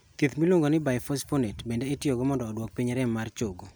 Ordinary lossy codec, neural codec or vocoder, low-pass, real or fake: none; none; none; real